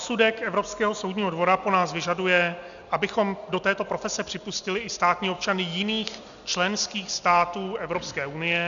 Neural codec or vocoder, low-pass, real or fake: none; 7.2 kHz; real